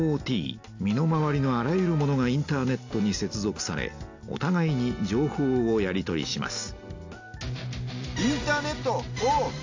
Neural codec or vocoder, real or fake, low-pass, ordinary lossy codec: none; real; 7.2 kHz; AAC, 48 kbps